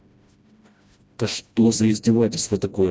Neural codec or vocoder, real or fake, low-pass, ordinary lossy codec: codec, 16 kHz, 1 kbps, FreqCodec, smaller model; fake; none; none